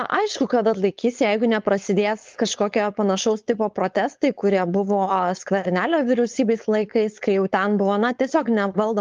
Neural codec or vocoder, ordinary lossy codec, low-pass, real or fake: codec, 16 kHz, 4.8 kbps, FACodec; Opus, 32 kbps; 7.2 kHz; fake